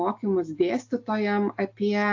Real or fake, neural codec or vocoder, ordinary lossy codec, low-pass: real; none; AAC, 48 kbps; 7.2 kHz